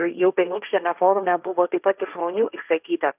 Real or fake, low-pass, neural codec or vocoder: fake; 3.6 kHz; codec, 16 kHz, 1.1 kbps, Voila-Tokenizer